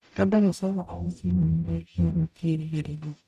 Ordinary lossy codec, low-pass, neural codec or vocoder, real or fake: none; 14.4 kHz; codec, 44.1 kHz, 0.9 kbps, DAC; fake